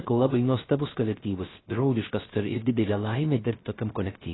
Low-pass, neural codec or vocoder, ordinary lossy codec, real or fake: 7.2 kHz; codec, 16 kHz, 0.3 kbps, FocalCodec; AAC, 16 kbps; fake